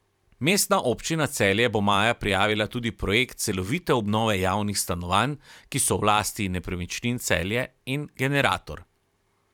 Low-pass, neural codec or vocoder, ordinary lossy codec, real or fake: 19.8 kHz; vocoder, 44.1 kHz, 128 mel bands every 512 samples, BigVGAN v2; none; fake